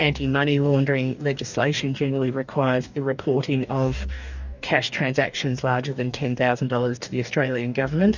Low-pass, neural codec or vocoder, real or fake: 7.2 kHz; codec, 44.1 kHz, 2.6 kbps, DAC; fake